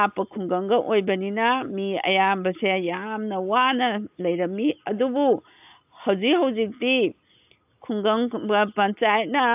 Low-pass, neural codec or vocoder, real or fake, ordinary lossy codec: 3.6 kHz; none; real; AAC, 32 kbps